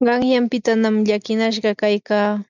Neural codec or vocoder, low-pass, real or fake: none; 7.2 kHz; real